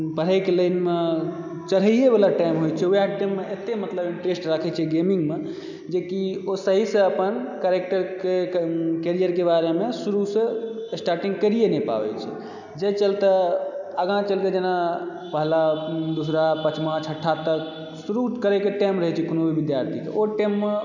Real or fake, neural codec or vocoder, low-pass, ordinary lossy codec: real; none; 7.2 kHz; none